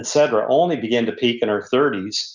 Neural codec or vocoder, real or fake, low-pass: none; real; 7.2 kHz